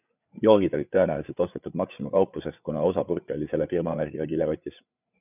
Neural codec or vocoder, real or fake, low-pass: codec, 16 kHz, 8 kbps, FreqCodec, larger model; fake; 3.6 kHz